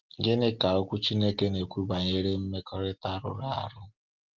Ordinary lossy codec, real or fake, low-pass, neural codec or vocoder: Opus, 16 kbps; real; 7.2 kHz; none